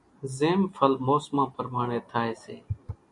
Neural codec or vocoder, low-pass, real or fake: none; 10.8 kHz; real